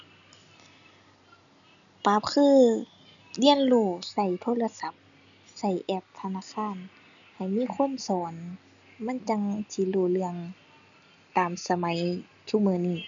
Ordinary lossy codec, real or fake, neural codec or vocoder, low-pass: none; real; none; 7.2 kHz